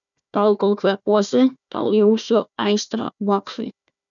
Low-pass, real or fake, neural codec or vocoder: 7.2 kHz; fake; codec, 16 kHz, 1 kbps, FunCodec, trained on Chinese and English, 50 frames a second